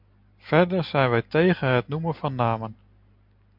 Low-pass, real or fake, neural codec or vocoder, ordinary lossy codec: 5.4 kHz; real; none; MP3, 48 kbps